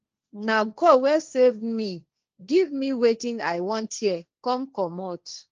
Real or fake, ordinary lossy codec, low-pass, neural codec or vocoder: fake; Opus, 24 kbps; 7.2 kHz; codec, 16 kHz, 1.1 kbps, Voila-Tokenizer